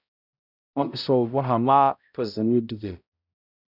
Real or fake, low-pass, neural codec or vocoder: fake; 5.4 kHz; codec, 16 kHz, 0.5 kbps, X-Codec, HuBERT features, trained on balanced general audio